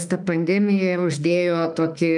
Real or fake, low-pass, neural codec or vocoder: fake; 10.8 kHz; autoencoder, 48 kHz, 32 numbers a frame, DAC-VAE, trained on Japanese speech